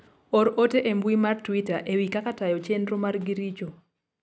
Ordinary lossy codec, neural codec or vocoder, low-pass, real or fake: none; none; none; real